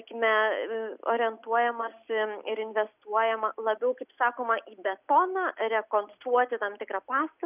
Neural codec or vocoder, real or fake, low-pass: none; real; 3.6 kHz